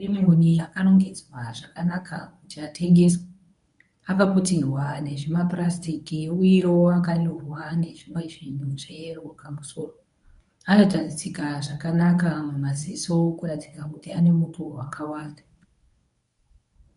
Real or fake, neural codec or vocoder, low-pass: fake; codec, 24 kHz, 0.9 kbps, WavTokenizer, medium speech release version 1; 10.8 kHz